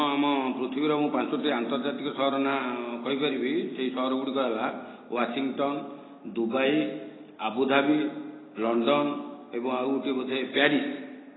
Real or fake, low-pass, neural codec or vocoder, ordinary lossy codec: real; 7.2 kHz; none; AAC, 16 kbps